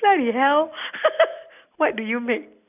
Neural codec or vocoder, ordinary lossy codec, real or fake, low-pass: none; none; real; 3.6 kHz